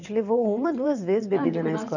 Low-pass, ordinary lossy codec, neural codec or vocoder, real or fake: 7.2 kHz; none; vocoder, 22.05 kHz, 80 mel bands, WaveNeXt; fake